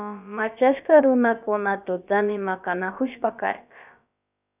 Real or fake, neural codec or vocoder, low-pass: fake; codec, 16 kHz, about 1 kbps, DyCAST, with the encoder's durations; 3.6 kHz